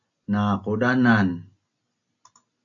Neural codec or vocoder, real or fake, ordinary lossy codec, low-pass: none; real; AAC, 64 kbps; 7.2 kHz